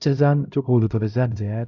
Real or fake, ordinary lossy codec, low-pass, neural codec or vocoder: fake; none; 7.2 kHz; codec, 16 kHz, 0.5 kbps, X-Codec, HuBERT features, trained on LibriSpeech